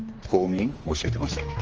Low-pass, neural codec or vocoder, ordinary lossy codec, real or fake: 7.2 kHz; codec, 16 kHz, 2 kbps, X-Codec, HuBERT features, trained on general audio; Opus, 24 kbps; fake